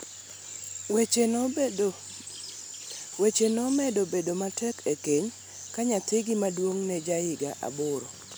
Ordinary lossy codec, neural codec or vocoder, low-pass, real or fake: none; none; none; real